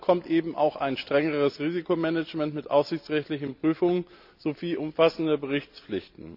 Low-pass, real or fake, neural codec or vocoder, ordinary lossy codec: 5.4 kHz; real; none; none